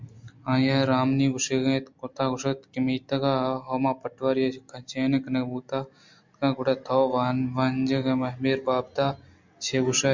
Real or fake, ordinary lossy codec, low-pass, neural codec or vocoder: real; MP3, 64 kbps; 7.2 kHz; none